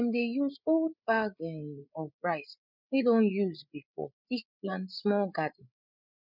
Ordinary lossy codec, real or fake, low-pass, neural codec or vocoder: none; fake; 5.4 kHz; codec, 16 kHz, 8 kbps, FreqCodec, larger model